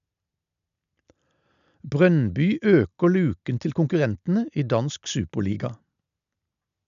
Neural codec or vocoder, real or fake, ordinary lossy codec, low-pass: none; real; none; 7.2 kHz